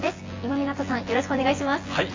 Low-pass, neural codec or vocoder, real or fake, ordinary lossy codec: 7.2 kHz; vocoder, 24 kHz, 100 mel bands, Vocos; fake; AAC, 32 kbps